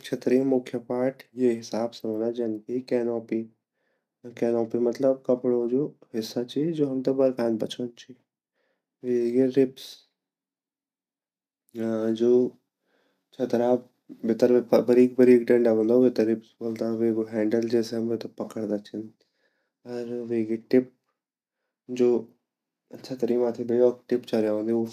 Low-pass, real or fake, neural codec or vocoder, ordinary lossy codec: 14.4 kHz; real; none; none